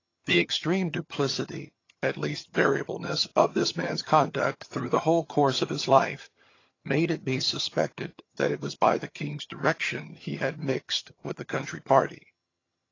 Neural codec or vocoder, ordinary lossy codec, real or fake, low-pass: vocoder, 22.05 kHz, 80 mel bands, HiFi-GAN; AAC, 32 kbps; fake; 7.2 kHz